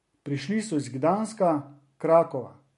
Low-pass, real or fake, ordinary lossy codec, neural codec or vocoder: 14.4 kHz; real; MP3, 48 kbps; none